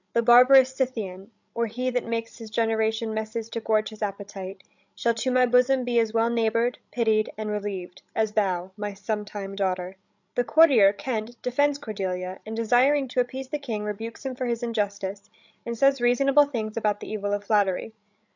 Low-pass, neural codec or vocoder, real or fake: 7.2 kHz; codec, 16 kHz, 16 kbps, FreqCodec, larger model; fake